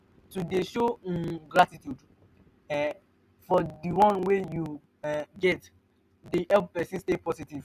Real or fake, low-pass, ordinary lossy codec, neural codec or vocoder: real; 14.4 kHz; none; none